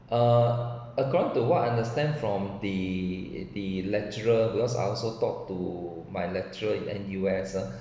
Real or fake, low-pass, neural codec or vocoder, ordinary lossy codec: real; none; none; none